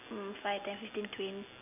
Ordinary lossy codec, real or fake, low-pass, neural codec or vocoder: none; real; 3.6 kHz; none